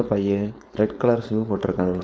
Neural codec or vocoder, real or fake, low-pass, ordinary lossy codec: codec, 16 kHz, 4.8 kbps, FACodec; fake; none; none